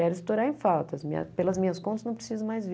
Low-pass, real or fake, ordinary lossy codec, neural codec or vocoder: none; real; none; none